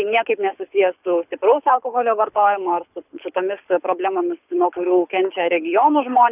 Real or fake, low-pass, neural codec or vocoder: fake; 3.6 kHz; codec, 24 kHz, 6 kbps, HILCodec